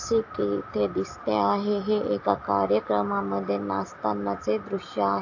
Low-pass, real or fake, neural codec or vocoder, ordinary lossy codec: 7.2 kHz; real; none; AAC, 48 kbps